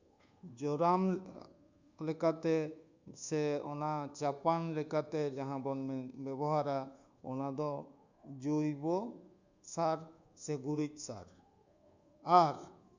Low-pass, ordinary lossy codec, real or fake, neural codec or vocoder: 7.2 kHz; Opus, 64 kbps; fake; codec, 24 kHz, 1.2 kbps, DualCodec